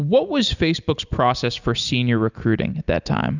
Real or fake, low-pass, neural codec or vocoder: real; 7.2 kHz; none